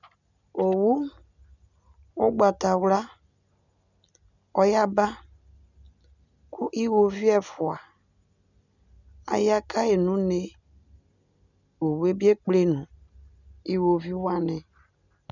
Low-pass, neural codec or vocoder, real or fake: 7.2 kHz; none; real